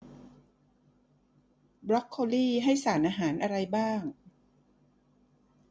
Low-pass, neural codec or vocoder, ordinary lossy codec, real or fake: none; none; none; real